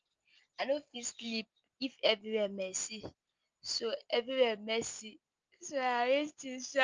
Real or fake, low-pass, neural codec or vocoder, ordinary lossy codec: real; 7.2 kHz; none; Opus, 24 kbps